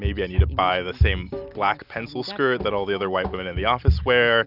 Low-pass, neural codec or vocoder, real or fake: 5.4 kHz; none; real